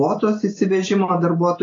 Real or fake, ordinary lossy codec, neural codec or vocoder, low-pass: real; AAC, 32 kbps; none; 7.2 kHz